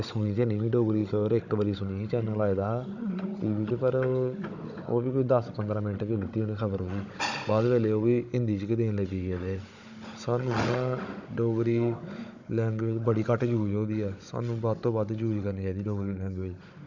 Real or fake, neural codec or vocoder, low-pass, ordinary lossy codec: fake; codec, 16 kHz, 16 kbps, FunCodec, trained on Chinese and English, 50 frames a second; 7.2 kHz; none